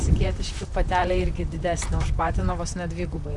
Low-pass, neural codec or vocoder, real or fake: 10.8 kHz; vocoder, 44.1 kHz, 128 mel bands, Pupu-Vocoder; fake